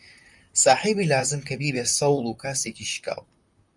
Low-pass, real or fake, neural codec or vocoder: 10.8 kHz; fake; vocoder, 44.1 kHz, 128 mel bands, Pupu-Vocoder